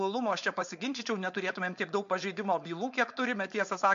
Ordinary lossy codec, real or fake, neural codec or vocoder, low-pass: MP3, 48 kbps; fake; codec, 16 kHz, 4.8 kbps, FACodec; 7.2 kHz